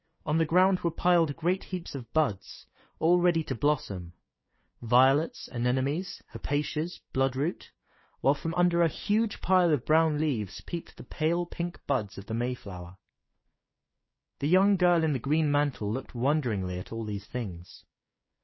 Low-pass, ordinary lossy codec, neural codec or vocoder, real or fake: 7.2 kHz; MP3, 24 kbps; codec, 16 kHz, 6 kbps, DAC; fake